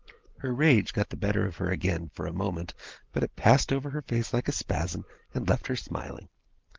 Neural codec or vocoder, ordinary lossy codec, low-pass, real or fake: none; Opus, 16 kbps; 7.2 kHz; real